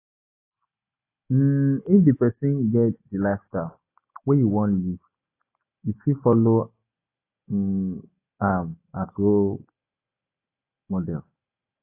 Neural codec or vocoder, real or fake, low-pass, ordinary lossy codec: none; real; 3.6 kHz; AAC, 24 kbps